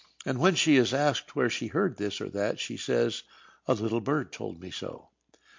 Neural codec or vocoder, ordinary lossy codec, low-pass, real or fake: none; MP3, 48 kbps; 7.2 kHz; real